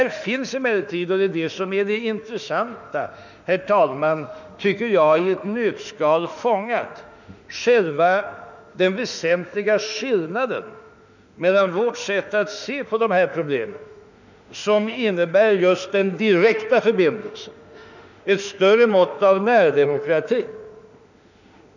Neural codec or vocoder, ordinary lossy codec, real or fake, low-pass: autoencoder, 48 kHz, 32 numbers a frame, DAC-VAE, trained on Japanese speech; none; fake; 7.2 kHz